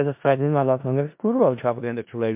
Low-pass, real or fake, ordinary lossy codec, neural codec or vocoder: 3.6 kHz; fake; MP3, 32 kbps; codec, 16 kHz in and 24 kHz out, 0.4 kbps, LongCat-Audio-Codec, four codebook decoder